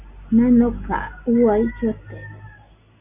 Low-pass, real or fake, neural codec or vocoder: 3.6 kHz; real; none